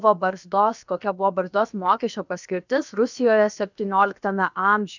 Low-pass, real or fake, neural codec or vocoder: 7.2 kHz; fake; codec, 16 kHz, about 1 kbps, DyCAST, with the encoder's durations